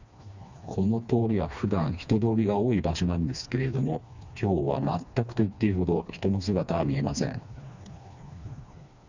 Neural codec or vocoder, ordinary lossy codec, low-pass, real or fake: codec, 16 kHz, 2 kbps, FreqCodec, smaller model; Opus, 64 kbps; 7.2 kHz; fake